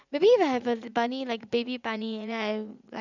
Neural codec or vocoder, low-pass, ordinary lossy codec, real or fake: vocoder, 44.1 kHz, 80 mel bands, Vocos; 7.2 kHz; none; fake